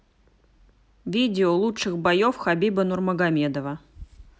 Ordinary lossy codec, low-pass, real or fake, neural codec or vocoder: none; none; real; none